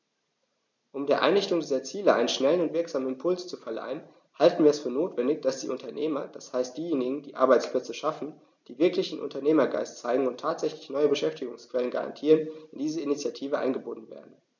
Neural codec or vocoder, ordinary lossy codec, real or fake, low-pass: none; none; real; 7.2 kHz